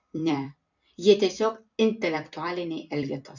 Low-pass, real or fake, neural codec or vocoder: 7.2 kHz; fake; vocoder, 44.1 kHz, 128 mel bands every 256 samples, BigVGAN v2